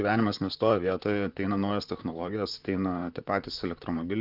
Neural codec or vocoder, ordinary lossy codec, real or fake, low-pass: vocoder, 44.1 kHz, 128 mel bands, Pupu-Vocoder; Opus, 24 kbps; fake; 5.4 kHz